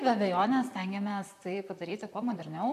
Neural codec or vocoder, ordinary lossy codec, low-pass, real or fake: vocoder, 44.1 kHz, 128 mel bands, Pupu-Vocoder; MP3, 96 kbps; 14.4 kHz; fake